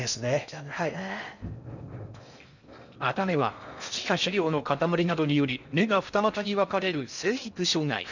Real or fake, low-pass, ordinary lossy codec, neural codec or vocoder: fake; 7.2 kHz; none; codec, 16 kHz in and 24 kHz out, 0.6 kbps, FocalCodec, streaming, 4096 codes